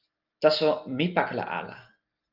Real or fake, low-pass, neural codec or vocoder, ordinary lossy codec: real; 5.4 kHz; none; Opus, 24 kbps